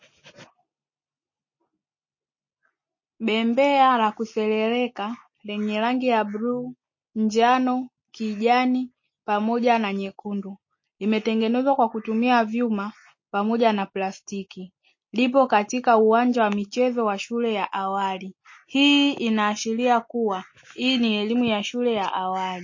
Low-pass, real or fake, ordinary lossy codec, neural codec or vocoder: 7.2 kHz; real; MP3, 32 kbps; none